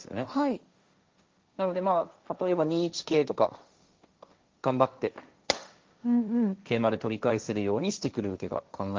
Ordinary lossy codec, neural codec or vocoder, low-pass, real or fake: Opus, 32 kbps; codec, 16 kHz, 1.1 kbps, Voila-Tokenizer; 7.2 kHz; fake